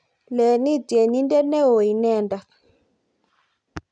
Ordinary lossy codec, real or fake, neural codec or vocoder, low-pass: none; real; none; 9.9 kHz